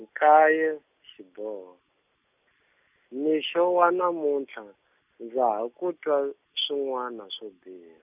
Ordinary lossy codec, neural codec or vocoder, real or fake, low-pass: none; none; real; 3.6 kHz